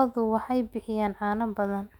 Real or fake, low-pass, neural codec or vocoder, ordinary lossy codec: fake; 19.8 kHz; autoencoder, 48 kHz, 128 numbers a frame, DAC-VAE, trained on Japanese speech; none